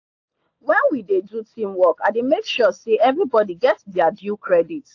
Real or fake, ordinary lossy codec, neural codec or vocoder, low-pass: real; AAC, 48 kbps; none; 7.2 kHz